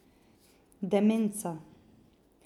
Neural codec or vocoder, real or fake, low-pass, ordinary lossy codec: none; real; 19.8 kHz; none